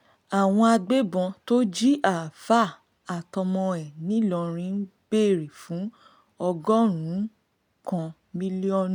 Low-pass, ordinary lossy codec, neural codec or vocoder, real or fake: 19.8 kHz; none; none; real